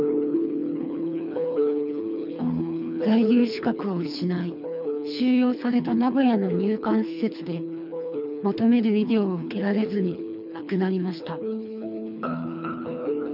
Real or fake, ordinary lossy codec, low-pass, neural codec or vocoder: fake; none; 5.4 kHz; codec, 24 kHz, 3 kbps, HILCodec